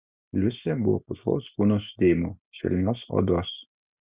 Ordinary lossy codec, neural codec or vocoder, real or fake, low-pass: Opus, 64 kbps; none; real; 3.6 kHz